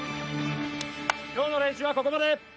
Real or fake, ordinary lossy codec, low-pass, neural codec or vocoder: real; none; none; none